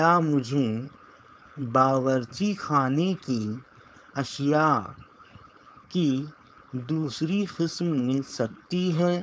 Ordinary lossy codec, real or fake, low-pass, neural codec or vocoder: none; fake; none; codec, 16 kHz, 4.8 kbps, FACodec